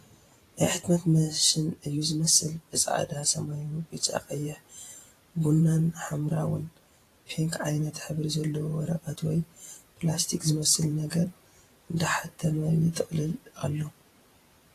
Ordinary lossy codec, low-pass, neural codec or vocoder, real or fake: AAC, 48 kbps; 14.4 kHz; vocoder, 44.1 kHz, 128 mel bands every 512 samples, BigVGAN v2; fake